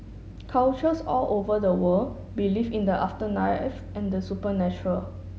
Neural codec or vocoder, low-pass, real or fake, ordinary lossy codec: none; none; real; none